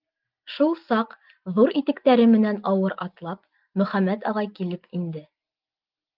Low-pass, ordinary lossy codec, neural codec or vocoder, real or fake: 5.4 kHz; Opus, 32 kbps; none; real